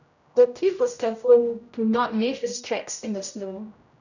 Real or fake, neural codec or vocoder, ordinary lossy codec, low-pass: fake; codec, 16 kHz, 0.5 kbps, X-Codec, HuBERT features, trained on general audio; none; 7.2 kHz